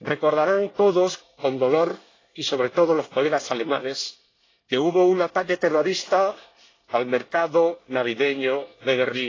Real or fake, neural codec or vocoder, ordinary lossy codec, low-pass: fake; codec, 24 kHz, 1 kbps, SNAC; AAC, 32 kbps; 7.2 kHz